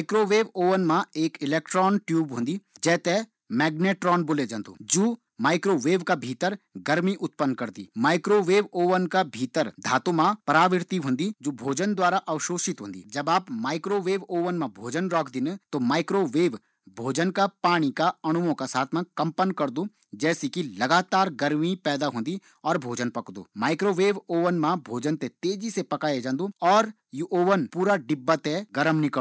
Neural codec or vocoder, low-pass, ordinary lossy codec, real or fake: none; none; none; real